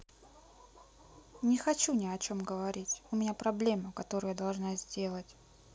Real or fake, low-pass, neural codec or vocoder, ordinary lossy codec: real; none; none; none